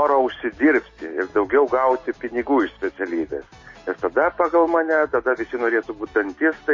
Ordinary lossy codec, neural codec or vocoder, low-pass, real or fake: MP3, 32 kbps; none; 7.2 kHz; real